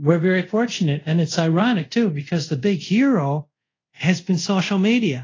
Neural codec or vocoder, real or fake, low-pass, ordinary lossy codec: codec, 24 kHz, 0.5 kbps, DualCodec; fake; 7.2 kHz; AAC, 32 kbps